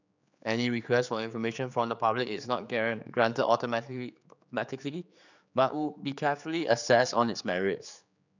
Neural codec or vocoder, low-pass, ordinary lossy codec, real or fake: codec, 16 kHz, 4 kbps, X-Codec, HuBERT features, trained on general audio; 7.2 kHz; none; fake